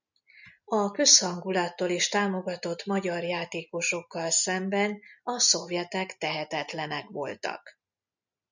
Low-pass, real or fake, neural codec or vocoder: 7.2 kHz; real; none